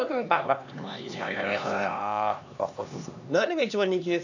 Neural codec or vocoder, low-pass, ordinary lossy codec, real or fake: codec, 16 kHz, 2 kbps, X-Codec, HuBERT features, trained on LibriSpeech; 7.2 kHz; none; fake